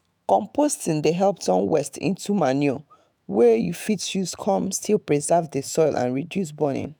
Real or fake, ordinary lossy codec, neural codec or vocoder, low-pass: fake; none; autoencoder, 48 kHz, 128 numbers a frame, DAC-VAE, trained on Japanese speech; none